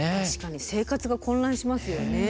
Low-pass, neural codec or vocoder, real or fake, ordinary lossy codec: none; none; real; none